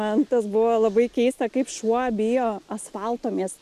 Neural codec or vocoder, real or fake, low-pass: none; real; 14.4 kHz